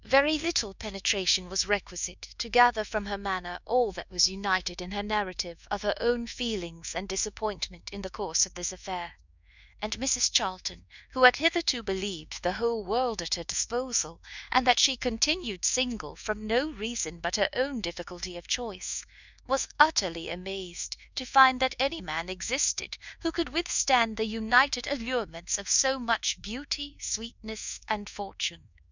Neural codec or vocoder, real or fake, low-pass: codec, 24 kHz, 1.2 kbps, DualCodec; fake; 7.2 kHz